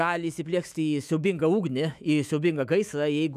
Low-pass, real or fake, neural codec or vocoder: 14.4 kHz; fake; autoencoder, 48 kHz, 128 numbers a frame, DAC-VAE, trained on Japanese speech